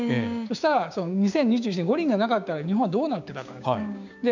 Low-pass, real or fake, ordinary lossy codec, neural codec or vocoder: 7.2 kHz; fake; none; codec, 16 kHz, 6 kbps, DAC